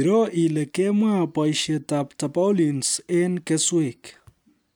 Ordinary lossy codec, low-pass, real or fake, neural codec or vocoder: none; none; real; none